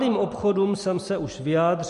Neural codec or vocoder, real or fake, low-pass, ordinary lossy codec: none; real; 14.4 kHz; MP3, 48 kbps